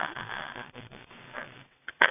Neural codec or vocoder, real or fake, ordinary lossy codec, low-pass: vocoder, 22.05 kHz, 80 mel bands, WaveNeXt; fake; none; 3.6 kHz